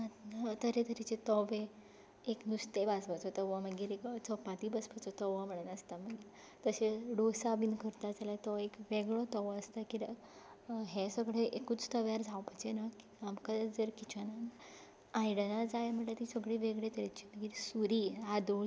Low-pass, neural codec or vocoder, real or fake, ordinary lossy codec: none; none; real; none